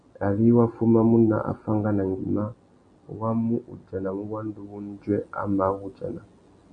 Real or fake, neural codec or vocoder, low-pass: real; none; 9.9 kHz